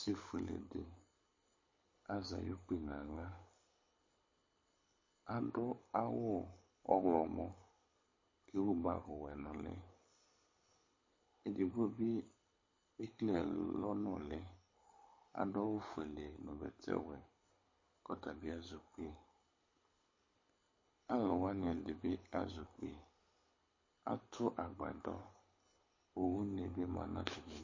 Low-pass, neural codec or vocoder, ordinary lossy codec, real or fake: 7.2 kHz; codec, 24 kHz, 6 kbps, HILCodec; MP3, 32 kbps; fake